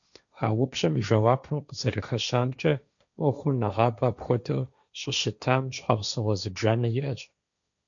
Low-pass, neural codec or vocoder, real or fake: 7.2 kHz; codec, 16 kHz, 1.1 kbps, Voila-Tokenizer; fake